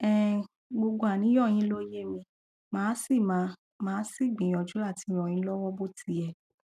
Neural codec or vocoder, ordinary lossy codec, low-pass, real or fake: none; none; 14.4 kHz; real